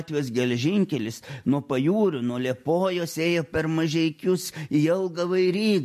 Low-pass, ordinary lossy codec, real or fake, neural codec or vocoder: 14.4 kHz; MP3, 64 kbps; real; none